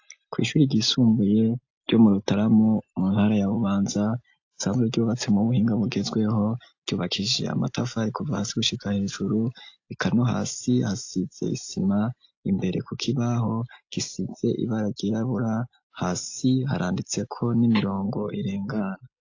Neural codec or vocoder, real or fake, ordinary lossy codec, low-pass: none; real; AAC, 48 kbps; 7.2 kHz